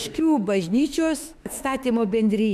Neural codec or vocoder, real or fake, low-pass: autoencoder, 48 kHz, 32 numbers a frame, DAC-VAE, trained on Japanese speech; fake; 14.4 kHz